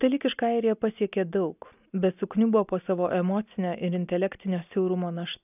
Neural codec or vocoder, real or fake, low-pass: none; real; 3.6 kHz